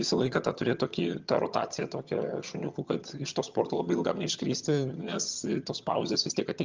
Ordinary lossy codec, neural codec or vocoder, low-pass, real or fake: Opus, 24 kbps; vocoder, 22.05 kHz, 80 mel bands, HiFi-GAN; 7.2 kHz; fake